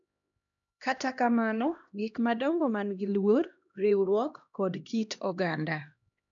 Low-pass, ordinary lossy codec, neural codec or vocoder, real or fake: 7.2 kHz; none; codec, 16 kHz, 1 kbps, X-Codec, HuBERT features, trained on LibriSpeech; fake